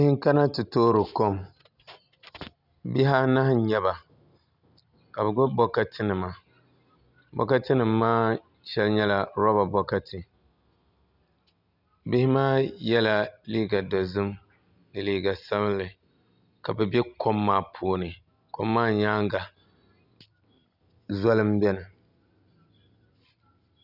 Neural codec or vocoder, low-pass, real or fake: none; 5.4 kHz; real